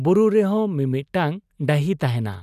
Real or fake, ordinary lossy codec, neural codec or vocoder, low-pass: real; none; none; 14.4 kHz